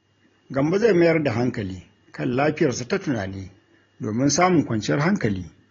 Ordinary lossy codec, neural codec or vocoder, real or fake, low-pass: AAC, 32 kbps; none; real; 7.2 kHz